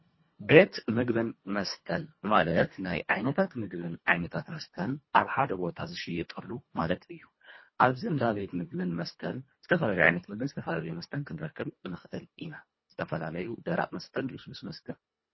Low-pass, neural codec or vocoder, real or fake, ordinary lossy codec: 7.2 kHz; codec, 24 kHz, 1.5 kbps, HILCodec; fake; MP3, 24 kbps